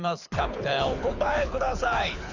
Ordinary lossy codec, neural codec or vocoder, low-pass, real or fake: Opus, 64 kbps; codec, 24 kHz, 6 kbps, HILCodec; 7.2 kHz; fake